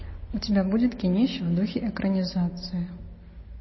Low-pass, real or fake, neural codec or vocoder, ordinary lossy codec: 7.2 kHz; real; none; MP3, 24 kbps